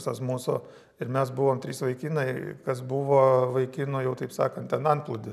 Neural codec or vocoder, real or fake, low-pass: none; real; 14.4 kHz